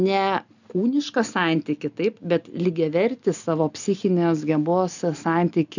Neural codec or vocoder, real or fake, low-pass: none; real; 7.2 kHz